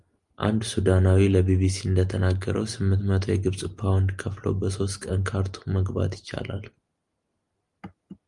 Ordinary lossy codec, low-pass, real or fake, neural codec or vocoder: Opus, 32 kbps; 10.8 kHz; real; none